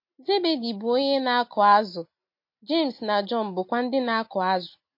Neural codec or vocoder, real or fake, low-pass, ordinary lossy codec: none; real; 5.4 kHz; MP3, 32 kbps